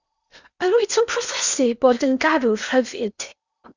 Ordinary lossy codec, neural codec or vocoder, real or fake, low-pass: Opus, 64 kbps; codec, 16 kHz in and 24 kHz out, 0.8 kbps, FocalCodec, streaming, 65536 codes; fake; 7.2 kHz